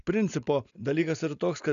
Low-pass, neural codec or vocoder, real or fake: 7.2 kHz; none; real